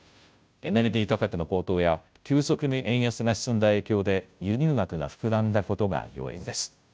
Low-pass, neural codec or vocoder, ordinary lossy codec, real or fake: none; codec, 16 kHz, 0.5 kbps, FunCodec, trained on Chinese and English, 25 frames a second; none; fake